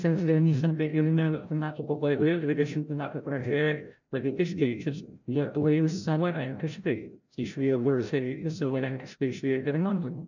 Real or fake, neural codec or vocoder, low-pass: fake; codec, 16 kHz, 0.5 kbps, FreqCodec, larger model; 7.2 kHz